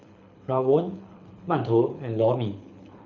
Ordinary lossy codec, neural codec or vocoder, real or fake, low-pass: none; codec, 24 kHz, 6 kbps, HILCodec; fake; 7.2 kHz